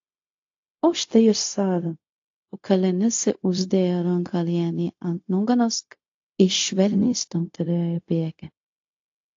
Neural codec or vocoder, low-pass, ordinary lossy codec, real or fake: codec, 16 kHz, 0.4 kbps, LongCat-Audio-Codec; 7.2 kHz; AAC, 48 kbps; fake